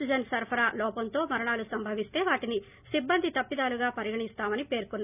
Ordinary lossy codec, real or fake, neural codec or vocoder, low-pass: none; real; none; 3.6 kHz